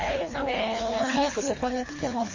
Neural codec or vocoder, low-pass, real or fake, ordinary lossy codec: codec, 24 kHz, 3 kbps, HILCodec; 7.2 kHz; fake; MP3, 32 kbps